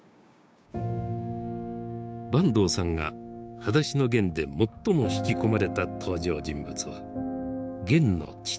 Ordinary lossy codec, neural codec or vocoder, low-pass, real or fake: none; codec, 16 kHz, 6 kbps, DAC; none; fake